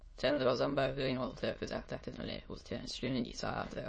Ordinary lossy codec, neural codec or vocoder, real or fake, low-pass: MP3, 32 kbps; autoencoder, 22.05 kHz, a latent of 192 numbers a frame, VITS, trained on many speakers; fake; 9.9 kHz